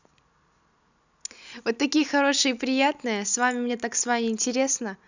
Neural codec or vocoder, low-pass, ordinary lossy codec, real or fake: none; 7.2 kHz; none; real